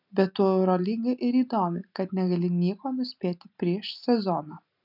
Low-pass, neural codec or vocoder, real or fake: 5.4 kHz; none; real